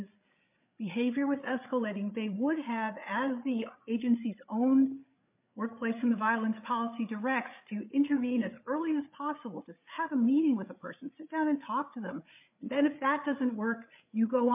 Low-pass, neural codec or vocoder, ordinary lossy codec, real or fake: 3.6 kHz; codec, 16 kHz, 8 kbps, FreqCodec, larger model; MP3, 32 kbps; fake